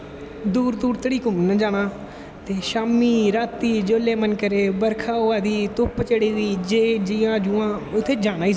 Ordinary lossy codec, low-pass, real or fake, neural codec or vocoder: none; none; real; none